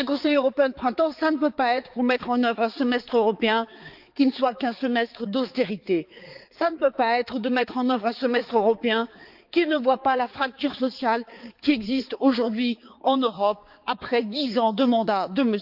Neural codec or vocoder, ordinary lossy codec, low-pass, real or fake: codec, 16 kHz, 4 kbps, X-Codec, HuBERT features, trained on balanced general audio; Opus, 24 kbps; 5.4 kHz; fake